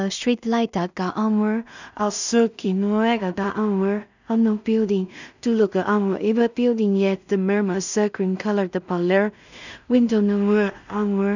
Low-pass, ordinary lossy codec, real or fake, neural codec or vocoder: 7.2 kHz; none; fake; codec, 16 kHz in and 24 kHz out, 0.4 kbps, LongCat-Audio-Codec, two codebook decoder